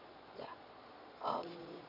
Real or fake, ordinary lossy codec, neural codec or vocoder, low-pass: fake; none; vocoder, 22.05 kHz, 80 mel bands, Vocos; 5.4 kHz